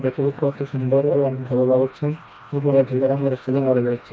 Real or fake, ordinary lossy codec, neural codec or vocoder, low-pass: fake; none; codec, 16 kHz, 1 kbps, FreqCodec, smaller model; none